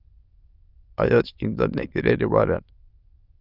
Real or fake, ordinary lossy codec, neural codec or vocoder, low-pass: fake; Opus, 24 kbps; autoencoder, 22.05 kHz, a latent of 192 numbers a frame, VITS, trained on many speakers; 5.4 kHz